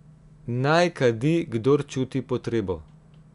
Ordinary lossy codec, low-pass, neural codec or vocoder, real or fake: none; 10.8 kHz; none; real